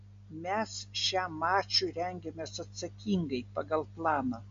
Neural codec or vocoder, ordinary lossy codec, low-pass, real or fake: none; MP3, 48 kbps; 7.2 kHz; real